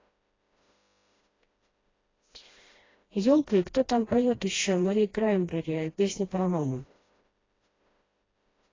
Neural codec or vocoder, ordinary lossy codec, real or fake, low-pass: codec, 16 kHz, 1 kbps, FreqCodec, smaller model; AAC, 32 kbps; fake; 7.2 kHz